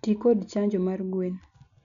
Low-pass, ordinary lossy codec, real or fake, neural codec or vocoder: 7.2 kHz; none; real; none